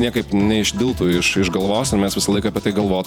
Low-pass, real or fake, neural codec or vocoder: 19.8 kHz; fake; vocoder, 48 kHz, 128 mel bands, Vocos